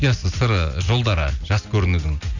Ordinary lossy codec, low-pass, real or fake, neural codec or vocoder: none; 7.2 kHz; real; none